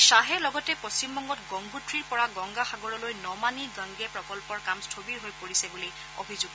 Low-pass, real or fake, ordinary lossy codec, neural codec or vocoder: none; real; none; none